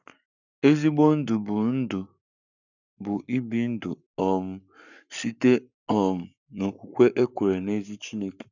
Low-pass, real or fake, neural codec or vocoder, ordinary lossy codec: 7.2 kHz; fake; codec, 44.1 kHz, 7.8 kbps, Pupu-Codec; none